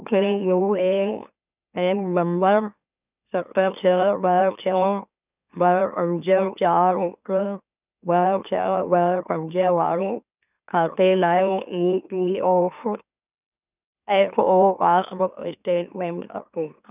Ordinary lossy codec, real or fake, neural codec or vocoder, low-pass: none; fake; autoencoder, 44.1 kHz, a latent of 192 numbers a frame, MeloTTS; 3.6 kHz